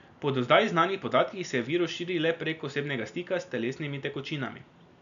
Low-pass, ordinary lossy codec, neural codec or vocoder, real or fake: 7.2 kHz; none; none; real